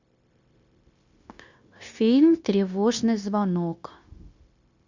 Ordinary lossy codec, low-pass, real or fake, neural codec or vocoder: Opus, 64 kbps; 7.2 kHz; fake; codec, 16 kHz, 0.9 kbps, LongCat-Audio-Codec